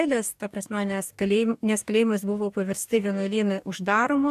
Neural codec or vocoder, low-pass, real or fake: codec, 44.1 kHz, 2.6 kbps, DAC; 14.4 kHz; fake